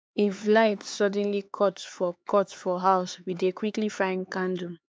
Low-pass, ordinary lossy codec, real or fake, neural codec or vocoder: none; none; fake; codec, 16 kHz, 4 kbps, X-Codec, HuBERT features, trained on LibriSpeech